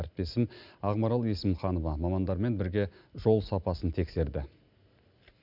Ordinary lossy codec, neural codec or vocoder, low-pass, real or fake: none; none; 5.4 kHz; real